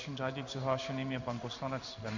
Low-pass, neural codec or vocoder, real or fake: 7.2 kHz; codec, 16 kHz in and 24 kHz out, 1 kbps, XY-Tokenizer; fake